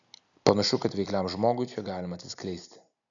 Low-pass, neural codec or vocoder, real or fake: 7.2 kHz; none; real